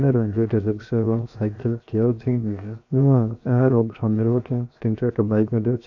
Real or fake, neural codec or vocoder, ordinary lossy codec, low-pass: fake; codec, 16 kHz, 0.7 kbps, FocalCodec; none; 7.2 kHz